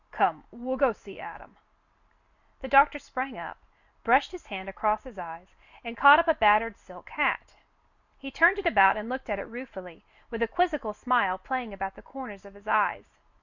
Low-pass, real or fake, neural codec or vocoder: 7.2 kHz; real; none